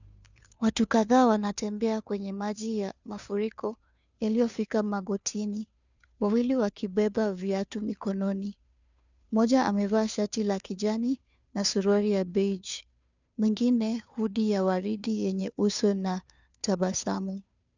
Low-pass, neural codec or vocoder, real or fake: 7.2 kHz; codec, 16 kHz, 2 kbps, FunCodec, trained on Chinese and English, 25 frames a second; fake